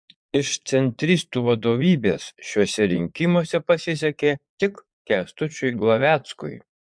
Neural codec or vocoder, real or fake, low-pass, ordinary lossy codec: vocoder, 22.05 kHz, 80 mel bands, Vocos; fake; 9.9 kHz; MP3, 64 kbps